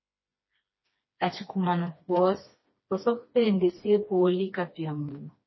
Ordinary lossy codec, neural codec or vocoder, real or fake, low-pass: MP3, 24 kbps; codec, 16 kHz, 2 kbps, FreqCodec, smaller model; fake; 7.2 kHz